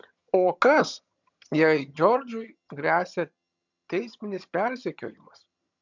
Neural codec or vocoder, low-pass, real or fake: vocoder, 22.05 kHz, 80 mel bands, HiFi-GAN; 7.2 kHz; fake